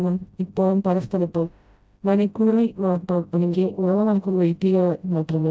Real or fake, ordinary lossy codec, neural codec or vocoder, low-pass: fake; none; codec, 16 kHz, 0.5 kbps, FreqCodec, smaller model; none